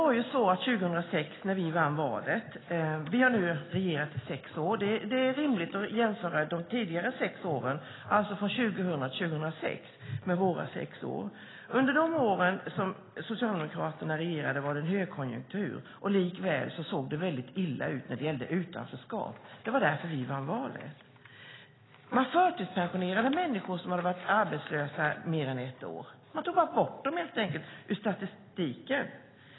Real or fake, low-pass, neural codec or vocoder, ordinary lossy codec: real; 7.2 kHz; none; AAC, 16 kbps